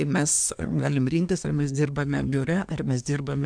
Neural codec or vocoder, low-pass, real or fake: codec, 24 kHz, 1 kbps, SNAC; 9.9 kHz; fake